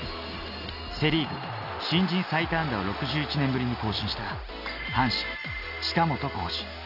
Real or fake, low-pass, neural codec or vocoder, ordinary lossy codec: real; 5.4 kHz; none; none